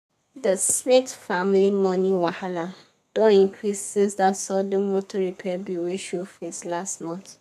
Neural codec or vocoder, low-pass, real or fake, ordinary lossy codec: codec, 32 kHz, 1.9 kbps, SNAC; 14.4 kHz; fake; none